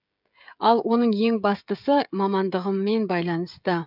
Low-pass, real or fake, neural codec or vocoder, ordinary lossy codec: 5.4 kHz; fake; codec, 16 kHz, 16 kbps, FreqCodec, smaller model; none